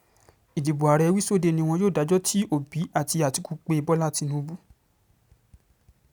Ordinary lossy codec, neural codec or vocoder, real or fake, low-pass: none; none; real; none